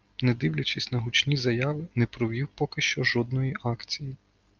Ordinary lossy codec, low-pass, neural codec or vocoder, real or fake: Opus, 24 kbps; 7.2 kHz; none; real